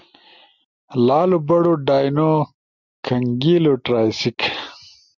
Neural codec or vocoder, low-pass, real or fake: none; 7.2 kHz; real